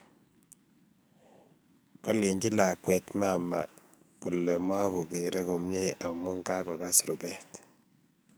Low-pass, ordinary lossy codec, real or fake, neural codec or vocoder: none; none; fake; codec, 44.1 kHz, 2.6 kbps, SNAC